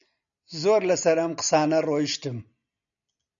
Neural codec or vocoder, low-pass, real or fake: none; 7.2 kHz; real